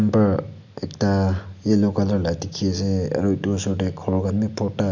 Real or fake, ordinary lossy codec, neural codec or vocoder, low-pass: real; none; none; 7.2 kHz